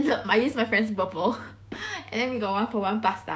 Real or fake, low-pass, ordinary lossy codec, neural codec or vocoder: fake; none; none; codec, 16 kHz, 6 kbps, DAC